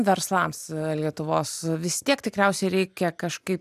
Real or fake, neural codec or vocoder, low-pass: real; none; 14.4 kHz